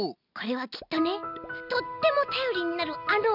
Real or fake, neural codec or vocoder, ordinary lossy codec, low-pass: real; none; none; 5.4 kHz